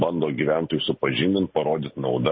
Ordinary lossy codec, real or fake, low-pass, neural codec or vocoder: MP3, 24 kbps; real; 7.2 kHz; none